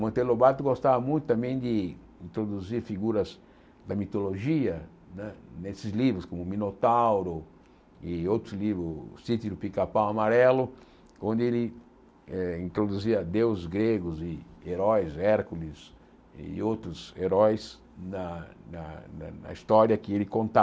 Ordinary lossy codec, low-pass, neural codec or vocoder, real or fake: none; none; none; real